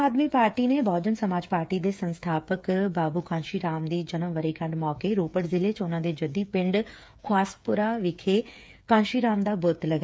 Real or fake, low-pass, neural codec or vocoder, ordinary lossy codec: fake; none; codec, 16 kHz, 8 kbps, FreqCodec, smaller model; none